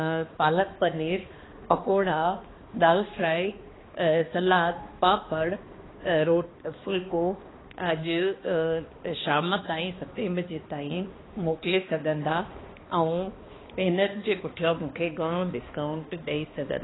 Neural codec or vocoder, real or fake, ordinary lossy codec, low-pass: codec, 16 kHz, 2 kbps, X-Codec, HuBERT features, trained on balanced general audio; fake; AAC, 16 kbps; 7.2 kHz